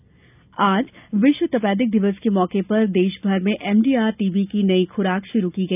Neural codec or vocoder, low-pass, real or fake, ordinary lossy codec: none; 3.6 kHz; real; none